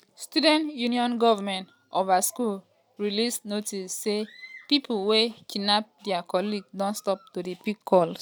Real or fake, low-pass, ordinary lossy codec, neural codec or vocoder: real; none; none; none